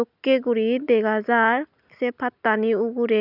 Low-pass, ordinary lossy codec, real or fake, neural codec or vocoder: 5.4 kHz; none; real; none